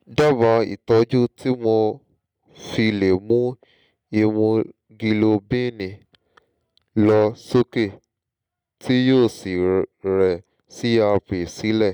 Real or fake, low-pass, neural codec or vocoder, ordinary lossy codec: real; 19.8 kHz; none; none